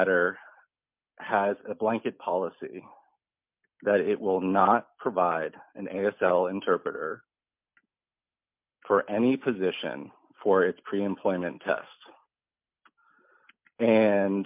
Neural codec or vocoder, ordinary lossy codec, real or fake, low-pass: none; MP3, 32 kbps; real; 3.6 kHz